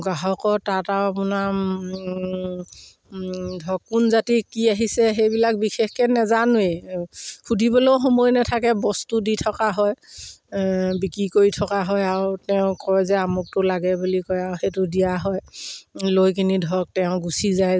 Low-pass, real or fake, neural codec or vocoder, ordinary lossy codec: none; real; none; none